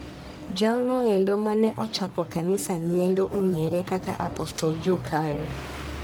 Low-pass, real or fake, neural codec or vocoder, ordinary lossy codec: none; fake; codec, 44.1 kHz, 1.7 kbps, Pupu-Codec; none